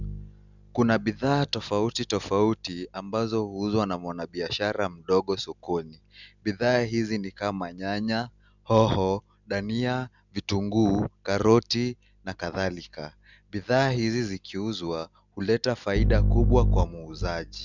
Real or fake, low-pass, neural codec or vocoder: real; 7.2 kHz; none